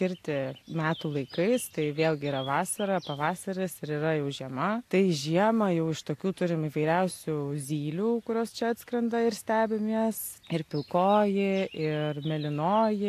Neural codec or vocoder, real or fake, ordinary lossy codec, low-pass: none; real; AAC, 64 kbps; 14.4 kHz